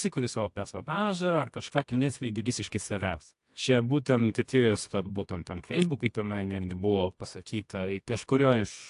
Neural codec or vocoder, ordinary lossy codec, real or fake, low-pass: codec, 24 kHz, 0.9 kbps, WavTokenizer, medium music audio release; AAC, 64 kbps; fake; 10.8 kHz